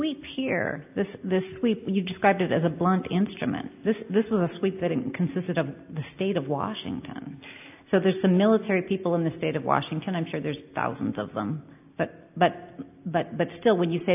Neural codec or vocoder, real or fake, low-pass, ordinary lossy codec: none; real; 3.6 kHz; AAC, 32 kbps